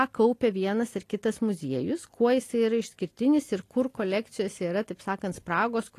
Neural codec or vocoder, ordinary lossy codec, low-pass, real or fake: none; AAC, 48 kbps; 14.4 kHz; real